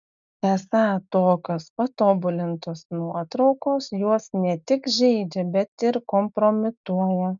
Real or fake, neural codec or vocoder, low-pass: real; none; 7.2 kHz